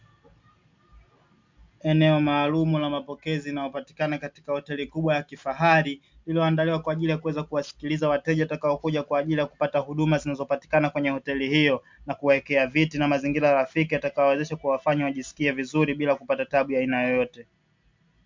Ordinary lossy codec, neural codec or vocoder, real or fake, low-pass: MP3, 64 kbps; none; real; 7.2 kHz